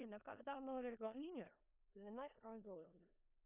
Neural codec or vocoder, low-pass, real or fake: codec, 16 kHz in and 24 kHz out, 0.4 kbps, LongCat-Audio-Codec, four codebook decoder; 3.6 kHz; fake